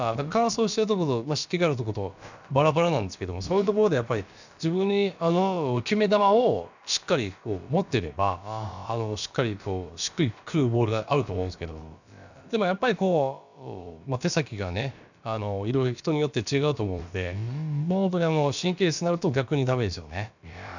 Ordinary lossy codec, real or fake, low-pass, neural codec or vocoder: none; fake; 7.2 kHz; codec, 16 kHz, about 1 kbps, DyCAST, with the encoder's durations